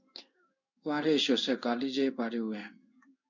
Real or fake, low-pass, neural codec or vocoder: fake; 7.2 kHz; codec, 16 kHz in and 24 kHz out, 1 kbps, XY-Tokenizer